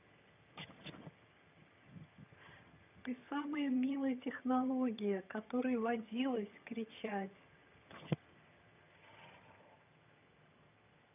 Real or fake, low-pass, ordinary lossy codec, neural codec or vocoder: fake; 3.6 kHz; none; vocoder, 22.05 kHz, 80 mel bands, HiFi-GAN